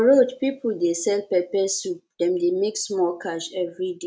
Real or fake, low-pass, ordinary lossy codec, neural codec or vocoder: real; none; none; none